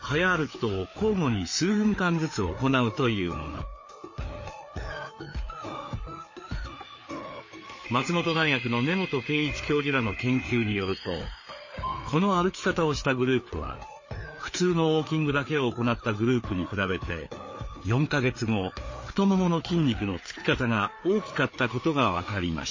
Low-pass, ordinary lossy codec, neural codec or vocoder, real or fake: 7.2 kHz; MP3, 32 kbps; codec, 16 kHz, 4 kbps, FreqCodec, larger model; fake